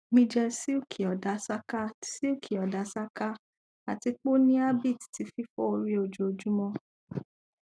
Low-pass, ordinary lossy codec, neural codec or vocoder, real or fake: none; none; none; real